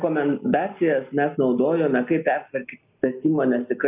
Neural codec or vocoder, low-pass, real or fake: none; 3.6 kHz; real